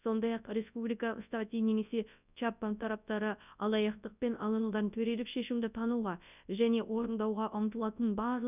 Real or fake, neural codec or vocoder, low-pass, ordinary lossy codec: fake; codec, 24 kHz, 0.9 kbps, WavTokenizer, large speech release; 3.6 kHz; none